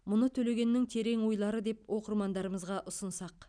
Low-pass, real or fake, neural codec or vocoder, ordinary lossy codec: 9.9 kHz; real; none; none